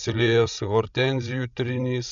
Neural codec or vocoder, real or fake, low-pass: codec, 16 kHz, 16 kbps, FreqCodec, larger model; fake; 7.2 kHz